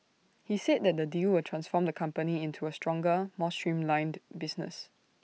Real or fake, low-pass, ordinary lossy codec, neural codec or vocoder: real; none; none; none